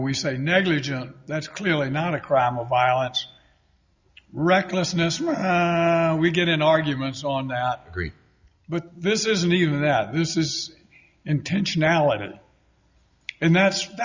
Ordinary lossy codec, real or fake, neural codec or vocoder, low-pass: Opus, 64 kbps; real; none; 7.2 kHz